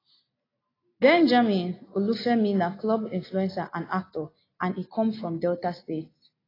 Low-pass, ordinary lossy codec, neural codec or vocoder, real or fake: 5.4 kHz; AAC, 24 kbps; none; real